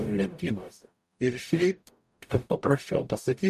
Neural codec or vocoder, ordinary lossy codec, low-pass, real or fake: codec, 44.1 kHz, 0.9 kbps, DAC; MP3, 96 kbps; 14.4 kHz; fake